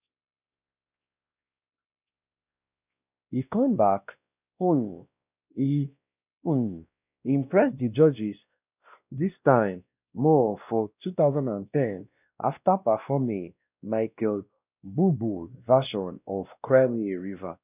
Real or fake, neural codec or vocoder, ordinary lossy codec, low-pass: fake; codec, 16 kHz, 1 kbps, X-Codec, WavLM features, trained on Multilingual LibriSpeech; none; 3.6 kHz